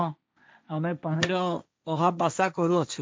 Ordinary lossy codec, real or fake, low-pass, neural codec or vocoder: none; fake; none; codec, 16 kHz, 1.1 kbps, Voila-Tokenizer